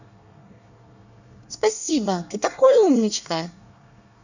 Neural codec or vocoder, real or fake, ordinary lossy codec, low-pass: codec, 24 kHz, 1 kbps, SNAC; fake; none; 7.2 kHz